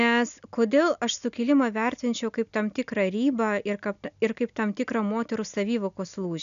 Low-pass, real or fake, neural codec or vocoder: 7.2 kHz; real; none